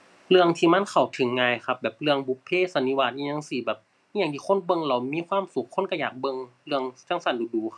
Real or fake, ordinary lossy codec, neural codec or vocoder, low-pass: real; none; none; none